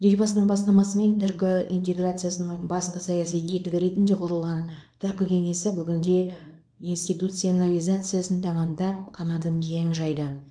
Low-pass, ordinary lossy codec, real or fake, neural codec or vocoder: 9.9 kHz; none; fake; codec, 24 kHz, 0.9 kbps, WavTokenizer, small release